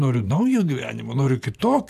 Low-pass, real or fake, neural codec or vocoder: 14.4 kHz; fake; vocoder, 44.1 kHz, 128 mel bands every 512 samples, BigVGAN v2